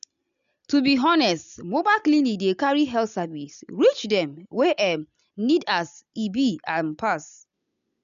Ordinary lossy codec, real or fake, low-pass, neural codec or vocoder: MP3, 96 kbps; real; 7.2 kHz; none